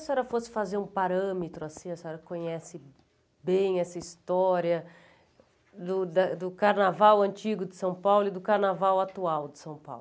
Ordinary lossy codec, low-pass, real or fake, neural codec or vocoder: none; none; real; none